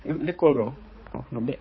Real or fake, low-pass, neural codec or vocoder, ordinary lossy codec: fake; 7.2 kHz; codec, 16 kHz, 4 kbps, X-Codec, HuBERT features, trained on balanced general audio; MP3, 24 kbps